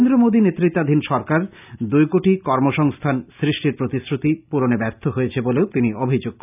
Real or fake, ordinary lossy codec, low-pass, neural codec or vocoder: real; none; 3.6 kHz; none